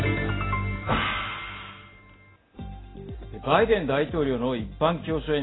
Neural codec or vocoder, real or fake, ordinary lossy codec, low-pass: none; real; AAC, 16 kbps; 7.2 kHz